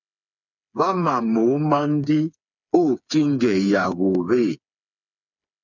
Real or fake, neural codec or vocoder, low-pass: fake; codec, 16 kHz, 4 kbps, FreqCodec, smaller model; 7.2 kHz